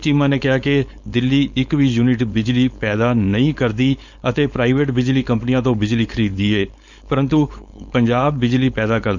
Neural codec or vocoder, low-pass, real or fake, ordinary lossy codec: codec, 16 kHz, 4.8 kbps, FACodec; 7.2 kHz; fake; none